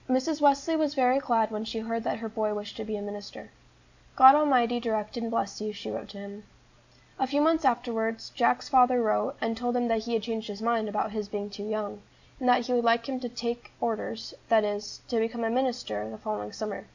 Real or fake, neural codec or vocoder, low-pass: real; none; 7.2 kHz